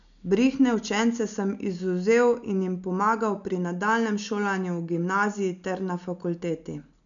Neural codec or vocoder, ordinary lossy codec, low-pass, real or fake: none; none; 7.2 kHz; real